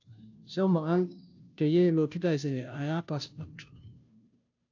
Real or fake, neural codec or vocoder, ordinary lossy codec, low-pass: fake; codec, 16 kHz, 0.5 kbps, FunCodec, trained on Chinese and English, 25 frames a second; AAC, 48 kbps; 7.2 kHz